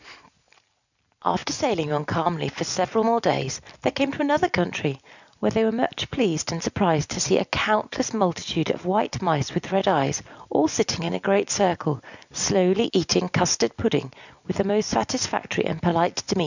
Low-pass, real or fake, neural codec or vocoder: 7.2 kHz; real; none